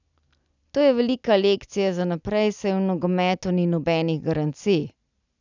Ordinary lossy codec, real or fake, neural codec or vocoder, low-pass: none; real; none; 7.2 kHz